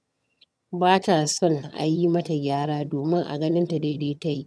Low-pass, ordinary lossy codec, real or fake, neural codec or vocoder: none; none; fake; vocoder, 22.05 kHz, 80 mel bands, HiFi-GAN